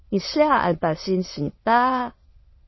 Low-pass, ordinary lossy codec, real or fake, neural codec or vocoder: 7.2 kHz; MP3, 24 kbps; fake; autoencoder, 22.05 kHz, a latent of 192 numbers a frame, VITS, trained on many speakers